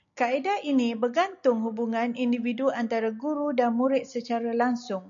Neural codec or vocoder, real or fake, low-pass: none; real; 7.2 kHz